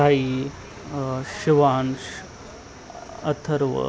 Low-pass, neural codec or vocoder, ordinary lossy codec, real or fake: none; none; none; real